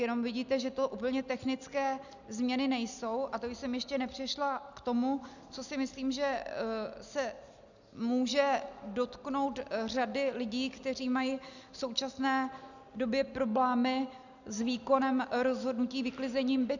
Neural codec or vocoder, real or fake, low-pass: none; real; 7.2 kHz